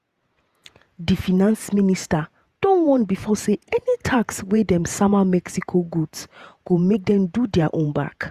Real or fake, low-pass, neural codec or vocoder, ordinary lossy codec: fake; 14.4 kHz; vocoder, 48 kHz, 128 mel bands, Vocos; Opus, 64 kbps